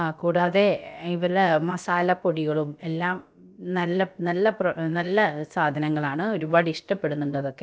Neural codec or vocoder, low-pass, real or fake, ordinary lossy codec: codec, 16 kHz, about 1 kbps, DyCAST, with the encoder's durations; none; fake; none